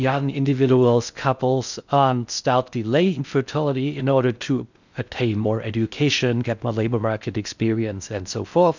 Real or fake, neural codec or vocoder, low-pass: fake; codec, 16 kHz in and 24 kHz out, 0.6 kbps, FocalCodec, streaming, 4096 codes; 7.2 kHz